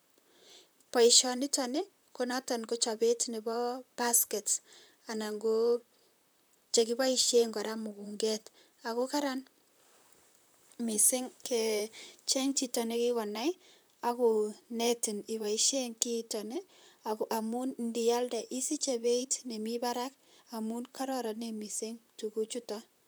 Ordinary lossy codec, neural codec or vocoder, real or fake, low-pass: none; vocoder, 44.1 kHz, 128 mel bands, Pupu-Vocoder; fake; none